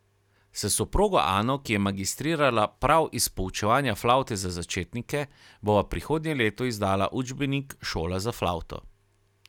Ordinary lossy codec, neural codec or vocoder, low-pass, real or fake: none; none; 19.8 kHz; real